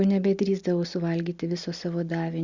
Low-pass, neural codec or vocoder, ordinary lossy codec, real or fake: 7.2 kHz; none; Opus, 64 kbps; real